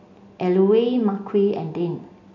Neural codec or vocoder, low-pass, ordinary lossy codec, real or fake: none; 7.2 kHz; none; real